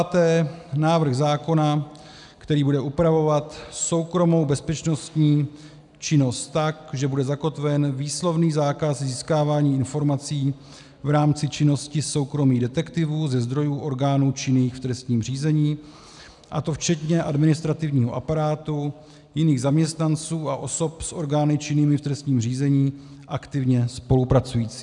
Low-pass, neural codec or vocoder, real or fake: 10.8 kHz; none; real